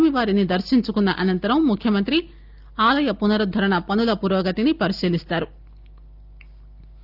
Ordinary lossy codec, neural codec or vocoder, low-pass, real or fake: Opus, 32 kbps; none; 5.4 kHz; real